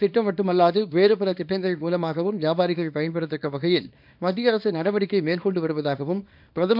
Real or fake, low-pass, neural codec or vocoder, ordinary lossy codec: fake; 5.4 kHz; codec, 24 kHz, 0.9 kbps, WavTokenizer, small release; none